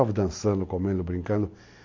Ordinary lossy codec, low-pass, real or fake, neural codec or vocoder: MP3, 48 kbps; 7.2 kHz; real; none